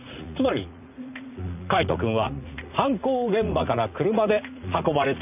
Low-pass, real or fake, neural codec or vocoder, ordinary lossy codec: 3.6 kHz; fake; vocoder, 44.1 kHz, 80 mel bands, Vocos; none